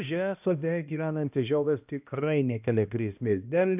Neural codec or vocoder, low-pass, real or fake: codec, 16 kHz, 1 kbps, X-Codec, HuBERT features, trained on balanced general audio; 3.6 kHz; fake